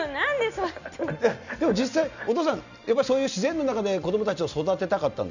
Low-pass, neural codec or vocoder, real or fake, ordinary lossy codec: 7.2 kHz; none; real; none